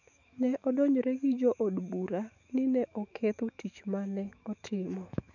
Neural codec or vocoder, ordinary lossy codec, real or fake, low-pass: autoencoder, 48 kHz, 128 numbers a frame, DAC-VAE, trained on Japanese speech; none; fake; 7.2 kHz